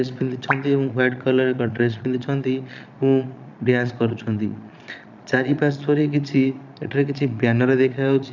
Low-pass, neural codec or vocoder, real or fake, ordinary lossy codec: 7.2 kHz; vocoder, 22.05 kHz, 80 mel bands, Vocos; fake; none